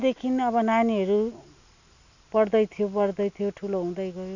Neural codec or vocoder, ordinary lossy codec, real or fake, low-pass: none; none; real; 7.2 kHz